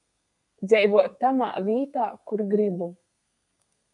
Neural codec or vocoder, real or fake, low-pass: codec, 32 kHz, 1.9 kbps, SNAC; fake; 10.8 kHz